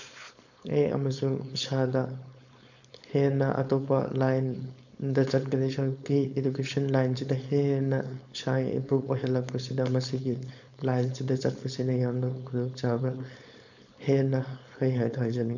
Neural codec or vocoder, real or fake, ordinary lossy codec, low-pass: codec, 16 kHz, 4.8 kbps, FACodec; fake; none; 7.2 kHz